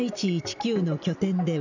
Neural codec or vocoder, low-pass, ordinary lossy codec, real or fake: none; 7.2 kHz; none; real